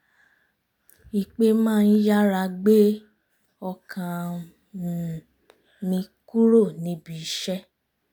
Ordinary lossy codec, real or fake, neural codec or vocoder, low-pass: none; real; none; none